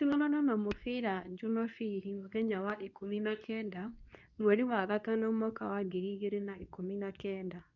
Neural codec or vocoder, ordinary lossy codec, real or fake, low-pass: codec, 24 kHz, 0.9 kbps, WavTokenizer, medium speech release version 2; none; fake; 7.2 kHz